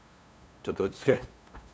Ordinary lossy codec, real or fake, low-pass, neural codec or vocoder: none; fake; none; codec, 16 kHz, 2 kbps, FunCodec, trained on LibriTTS, 25 frames a second